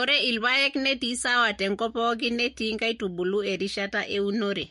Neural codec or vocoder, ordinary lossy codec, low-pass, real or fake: autoencoder, 48 kHz, 128 numbers a frame, DAC-VAE, trained on Japanese speech; MP3, 48 kbps; 14.4 kHz; fake